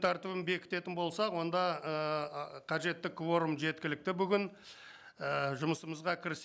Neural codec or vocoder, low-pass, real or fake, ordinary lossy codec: none; none; real; none